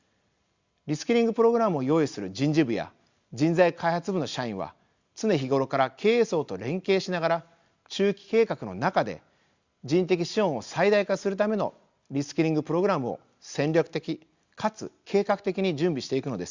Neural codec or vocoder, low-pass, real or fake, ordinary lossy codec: none; 7.2 kHz; real; Opus, 64 kbps